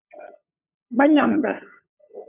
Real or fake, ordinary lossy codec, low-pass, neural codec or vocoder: fake; MP3, 32 kbps; 3.6 kHz; codec, 16 kHz, 8 kbps, FunCodec, trained on LibriTTS, 25 frames a second